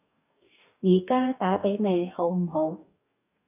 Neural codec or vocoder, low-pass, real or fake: codec, 44.1 kHz, 2.6 kbps, DAC; 3.6 kHz; fake